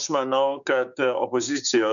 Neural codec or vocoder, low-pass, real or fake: codec, 16 kHz, 6 kbps, DAC; 7.2 kHz; fake